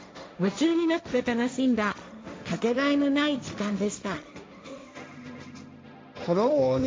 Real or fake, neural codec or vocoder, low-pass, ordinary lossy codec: fake; codec, 16 kHz, 1.1 kbps, Voila-Tokenizer; none; none